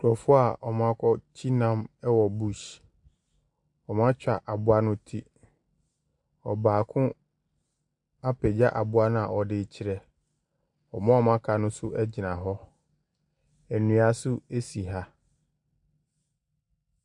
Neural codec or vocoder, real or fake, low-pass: none; real; 10.8 kHz